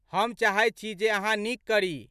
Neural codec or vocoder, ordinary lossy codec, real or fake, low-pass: none; none; real; 14.4 kHz